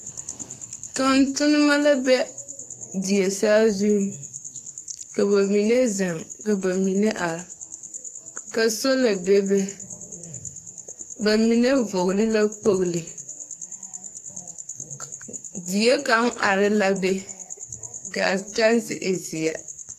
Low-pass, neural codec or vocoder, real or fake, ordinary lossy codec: 14.4 kHz; codec, 44.1 kHz, 2.6 kbps, SNAC; fake; AAC, 64 kbps